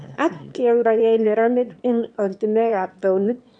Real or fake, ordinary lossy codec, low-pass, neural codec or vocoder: fake; none; 9.9 kHz; autoencoder, 22.05 kHz, a latent of 192 numbers a frame, VITS, trained on one speaker